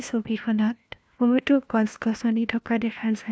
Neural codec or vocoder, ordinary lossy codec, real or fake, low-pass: codec, 16 kHz, 1 kbps, FunCodec, trained on LibriTTS, 50 frames a second; none; fake; none